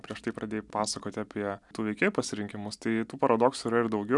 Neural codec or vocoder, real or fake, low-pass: none; real; 10.8 kHz